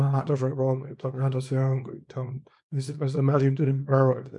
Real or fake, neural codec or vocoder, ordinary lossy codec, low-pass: fake; codec, 24 kHz, 0.9 kbps, WavTokenizer, small release; MP3, 64 kbps; 10.8 kHz